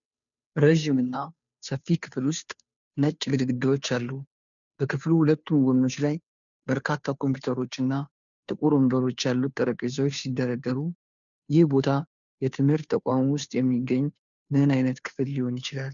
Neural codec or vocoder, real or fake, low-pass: codec, 16 kHz, 2 kbps, FunCodec, trained on Chinese and English, 25 frames a second; fake; 7.2 kHz